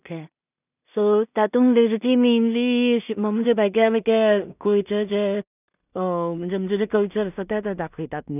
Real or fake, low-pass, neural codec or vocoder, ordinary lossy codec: fake; 3.6 kHz; codec, 16 kHz in and 24 kHz out, 0.4 kbps, LongCat-Audio-Codec, two codebook decoder; none